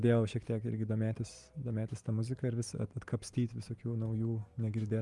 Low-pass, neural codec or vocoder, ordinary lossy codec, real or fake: 10.8 kHz; none; Opus, 32 kbps; real